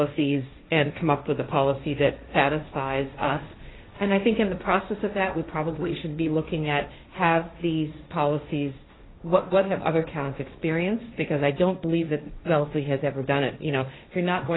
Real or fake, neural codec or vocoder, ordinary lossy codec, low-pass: fake; codec, 16 kHz, 1.1 kbps, Voila-Tokenizer; AAC, 16 kbps; 7.2 kHz